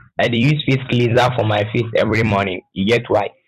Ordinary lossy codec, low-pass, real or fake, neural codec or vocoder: none; 14.4 kHz; fake; vocoder, 44.1 kHz, 128 mel bands every 256 samples, BigVGAN v2